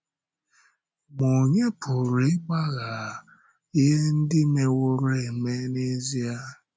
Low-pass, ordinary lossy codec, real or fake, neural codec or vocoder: none; none; real; none